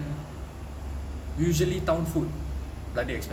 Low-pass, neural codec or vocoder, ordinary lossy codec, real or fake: 19.8 kHz; none; none; real